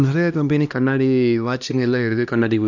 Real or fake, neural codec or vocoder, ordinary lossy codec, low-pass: fake; codec, 16 kHz, 1 kbps, X-Codec, HuBERT features, trained on LibriSpeech; none; 7.2 kHz